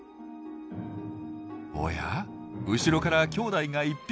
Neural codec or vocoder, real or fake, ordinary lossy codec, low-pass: none; real; none; none